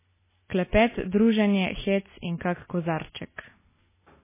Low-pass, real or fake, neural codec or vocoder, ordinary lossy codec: 3.6 kHz; real; none; MP3, 16 kbps